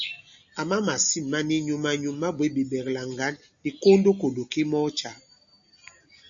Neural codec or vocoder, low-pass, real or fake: none; 7.2 kHz; real